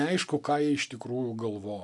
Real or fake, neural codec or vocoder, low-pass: real; none; 10.8 kHz